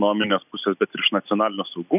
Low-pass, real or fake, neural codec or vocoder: 3.6 kHz; real; none